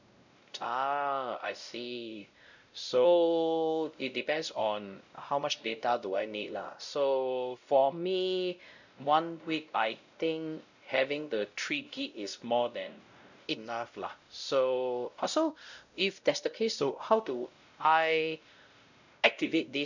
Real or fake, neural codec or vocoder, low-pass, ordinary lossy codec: fake; codec, 16 kHz, 0.5 kbps, X-Codec, WavLM features, trained on Multilingual LibriSpeech; 7.2 kHz; none